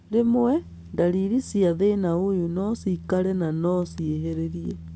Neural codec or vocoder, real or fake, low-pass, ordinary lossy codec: none; real; none; none